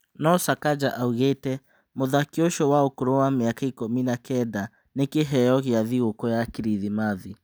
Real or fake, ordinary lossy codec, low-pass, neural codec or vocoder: real; none; none; none